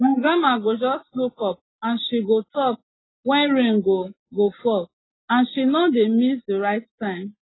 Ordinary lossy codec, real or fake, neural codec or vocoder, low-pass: AAC, 16 kbps; real; none; 7.2 kHz